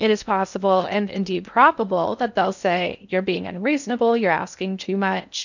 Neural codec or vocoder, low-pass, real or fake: codec, 16 kHz in and 24 kHz out, 0.6 kbps, FocalCodec, streaming, 2048 codes; 7.2 kHz; fake